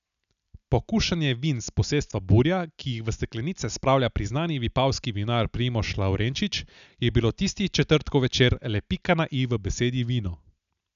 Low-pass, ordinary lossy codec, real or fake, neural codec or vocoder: 7.2 kHz; none; real; none